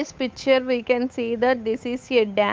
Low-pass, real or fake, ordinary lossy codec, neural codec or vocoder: none; fake; none; codec, 16 kHz, 8 kbps, FunCodec, trained on Chinese and English, 25 frames a second